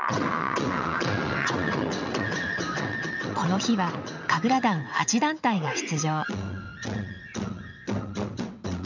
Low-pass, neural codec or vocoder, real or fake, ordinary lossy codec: 7.2 kHz; vocoder, 22.05 kHz, 80 mel bands, WaveNeXt; fake; none